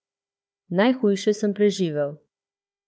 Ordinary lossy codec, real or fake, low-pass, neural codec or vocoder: none; fake; none; codec, 16 kHz, 4 kbps, FunCodec, trained on Chinese and English, 50 frames a second